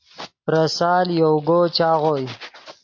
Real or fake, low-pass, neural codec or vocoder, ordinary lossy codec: real; 7.2 kHz; none; AAC, 48 kbps